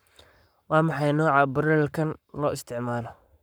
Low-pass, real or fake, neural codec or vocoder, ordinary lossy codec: none; fake; codec, 44.1 kHz, 7.8 kbps, Pupu-Codec; none